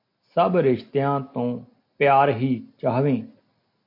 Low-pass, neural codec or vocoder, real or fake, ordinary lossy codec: 5.4 kHz; none; real; MP3, 32 kbps